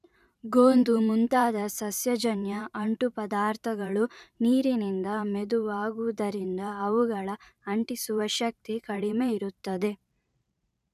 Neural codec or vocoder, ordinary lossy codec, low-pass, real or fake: vocoder, 44.1 kHz, 128 mel bands every 512 samples, BigVGAN v2; none; 14.4 kHz; fake